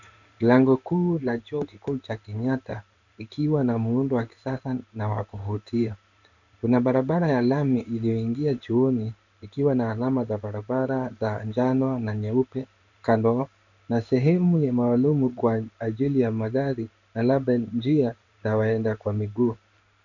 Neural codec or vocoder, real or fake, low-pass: codec, 16 kHz in and 24 kHz out, 1 kbps, XY-Tokenizer; fake; 7.2 kHz